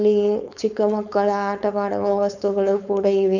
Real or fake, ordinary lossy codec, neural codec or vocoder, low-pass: fake; none; codec, 16 kHz, 4.8 kbps, FACodec; 7.2 kHz